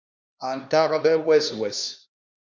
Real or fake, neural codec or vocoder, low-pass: fake; codec, 16 kHz, 2 kbps, X-Codec, HuBERT features, trained on LibriSpeech; 7.2 kHz